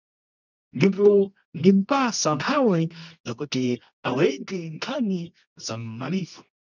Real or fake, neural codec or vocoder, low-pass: fake; codec, 24 kHz, 0.9 kbps, WavTokenizer, medium music audio release; 7.2 kHz